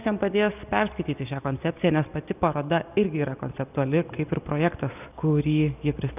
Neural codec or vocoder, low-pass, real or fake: none; 3.6 kHz; real